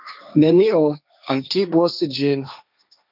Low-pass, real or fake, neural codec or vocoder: 5.4 kHz; fake; codec, 16 kHz, 1.1 kbps, Voila-Tokenizer